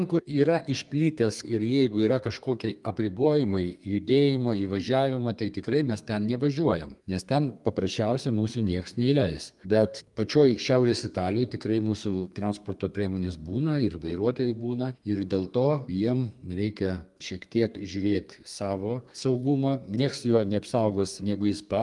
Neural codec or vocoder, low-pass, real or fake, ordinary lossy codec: codec, 32 kHz, 1.9 kbps, SNAC; 10.8 kHz; fake; Opus, 32 kbps